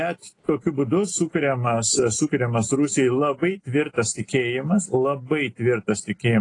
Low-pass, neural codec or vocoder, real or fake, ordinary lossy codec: 10.8 kHz; none; real; AAC, 32 kbps